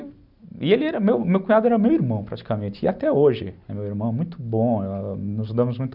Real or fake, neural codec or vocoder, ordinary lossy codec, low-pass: real; none; none; 5.4 kHz